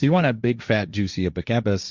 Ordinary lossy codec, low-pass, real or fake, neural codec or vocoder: Opus, 64 kbps; 7.2 kHz; fake; codec, 16 kHz, 1.1 kbps, Voila-Tokenizer